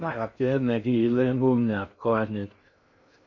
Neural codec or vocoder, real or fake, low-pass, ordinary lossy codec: codec, 16 kHz in and 24 kHz out, 0.6 kbps, FocalCodec, streaming, 2048 codes; fake; 7.2 kHz; none